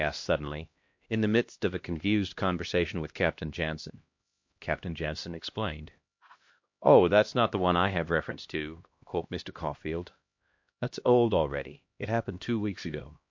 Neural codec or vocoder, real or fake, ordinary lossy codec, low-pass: codec, 16 kHz, 1 kbps, X-Codec, HuBERT features, trained on LibriSpeech; fake; MP3, 48 kbps; 7.2 kHz